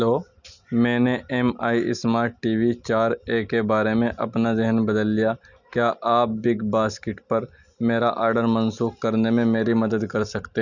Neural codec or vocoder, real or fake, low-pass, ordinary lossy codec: none; real; 7.2 kHz; none